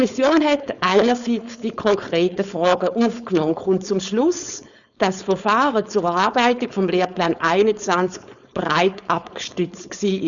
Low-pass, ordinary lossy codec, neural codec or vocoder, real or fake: 7.2 kHz; none; codec, 16 kHz, 4.8 kbps, FACodec; fake